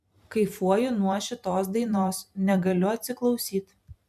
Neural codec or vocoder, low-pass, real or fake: vocoder, 44.1 kHz, 128 mel bands every 512 samples, BigVGAN v2; 14.4 kHz; fake